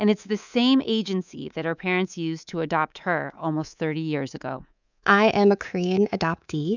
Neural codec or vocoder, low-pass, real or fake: codec, 24 kHz, 3.1 kbps, DualCodec; 7.2 kHz; fake